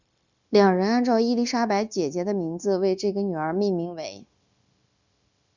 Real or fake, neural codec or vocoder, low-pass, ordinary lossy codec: fake; codec, 16 kHz, 0.9 kbps, LongCat-Audio-Codec; 7.2 kHz; Opus, 64 kbps